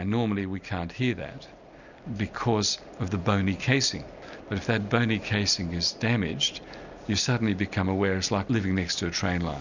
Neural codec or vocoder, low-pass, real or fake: none; 7.2 kHz; real